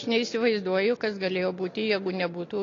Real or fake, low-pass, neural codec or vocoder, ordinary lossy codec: real; 7.2 kHz; none; AAC, 32 kbps